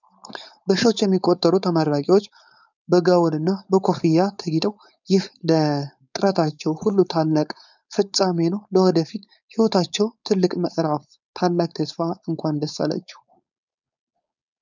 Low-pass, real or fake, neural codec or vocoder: 7.2 kHz; fake; codec, 16 kHz, 4.8 kbps, FACodec